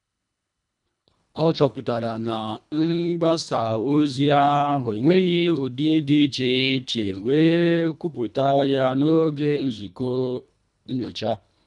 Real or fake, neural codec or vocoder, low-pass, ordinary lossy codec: fake; codec, 24 kHz, 1.5 kbps, HILCodec; 10.8 kHz; none